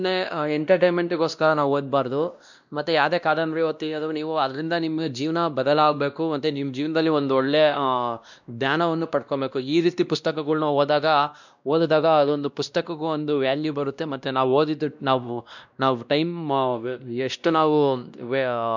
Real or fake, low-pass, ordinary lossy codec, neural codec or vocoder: fake; 7.2 kHz; none; codec, 16 kHz, 1 kbps, X-Codec, WavLM features, trained on Multilingual LibriSpeech